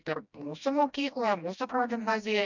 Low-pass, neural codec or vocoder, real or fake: 7.2 kHz; codec, 16 kHz, 1 kbps, FreqCodec, smaller model; fake